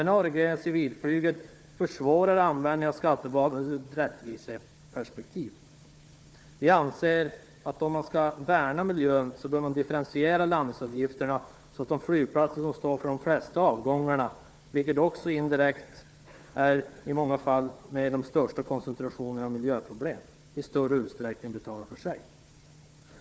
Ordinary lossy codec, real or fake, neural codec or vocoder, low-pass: none; fake; codec, 16 kHz, 4 kbps, FunCodec, trained on Chinese and English, 50 frames a second; none